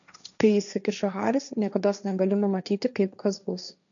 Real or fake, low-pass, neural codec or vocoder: fake; 7.2 kHz; codec, 16 kHz, 1.1 kbps, Voila-Tokenizer